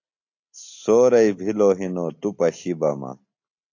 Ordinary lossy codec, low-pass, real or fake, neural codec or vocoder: AAC, 48 kbps; 7.2 kHz; real; none